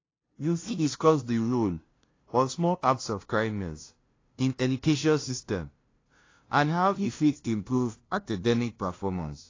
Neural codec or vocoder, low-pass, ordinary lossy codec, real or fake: codec, 16 kHz, 0.5 kbps, FunCodec, trained on LibriTTS, 25 frames a second; 7.2 kHz; AAC, 32 kbps; fake